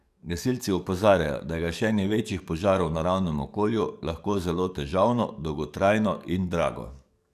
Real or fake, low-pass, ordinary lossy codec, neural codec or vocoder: fake; 14.4 kHz; none; codec, 44.1 kHz, 7.8 kbps, DAC